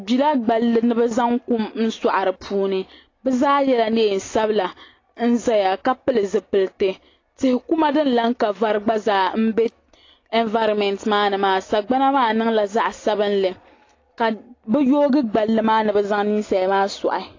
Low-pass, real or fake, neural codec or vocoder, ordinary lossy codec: 7.2 kHz; real; none; AAC, 32 kbps